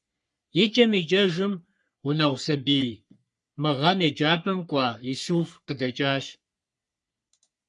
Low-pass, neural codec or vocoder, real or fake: 10.8 kHz; codec, 44.1 kHz, 3.4 kbps, Pupu-Codec; fake